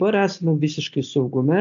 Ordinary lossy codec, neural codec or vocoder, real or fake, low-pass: AAC, 64 kbps; none; real; 7.2 kHz